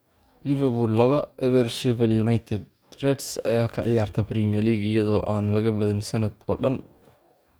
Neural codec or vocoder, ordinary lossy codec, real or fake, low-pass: codec, 44.1 kHz, 2.6 kbps, DAC; none; fake; none